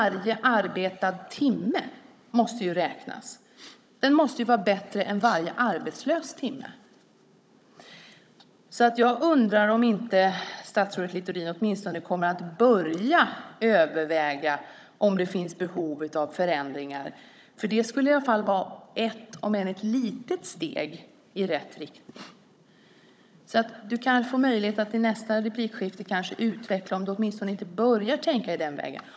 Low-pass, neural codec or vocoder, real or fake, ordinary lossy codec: none; codec, 16 kHz, 16 kbps, FunCodec, trained on Chinese and English, 50 frames a second; fake; none